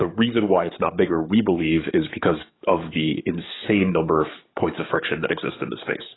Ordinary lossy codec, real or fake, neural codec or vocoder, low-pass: AAC, 16 kbps; fake; codec, 44.1 kHz, 7.8 kbps, DAC; 7.2 kHz